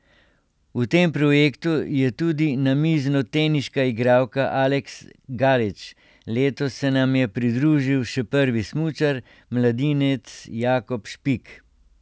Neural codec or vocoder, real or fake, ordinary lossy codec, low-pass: none; real; none; none